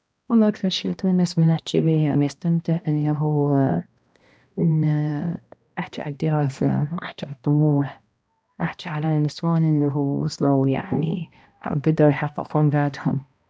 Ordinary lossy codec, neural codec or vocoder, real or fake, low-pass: none; codec, 16 kHz, 1 kbps, X-Codec, HuBERT features, trained on balanced general audio; fake; none